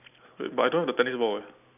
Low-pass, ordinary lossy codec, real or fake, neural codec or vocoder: 3.6 kHz; none; real; none